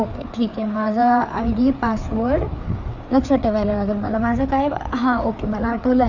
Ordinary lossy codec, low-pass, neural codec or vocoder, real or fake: none; 7.2 kHz; codec, 16 kHz, 4 kbps, FreqCodec, larger model; fake